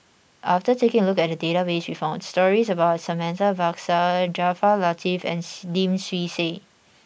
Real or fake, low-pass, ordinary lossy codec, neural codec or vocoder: real; none; none; none